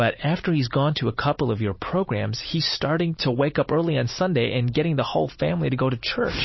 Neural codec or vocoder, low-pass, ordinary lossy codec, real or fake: none; 7.2 kHz; MP3, 24 kbps; real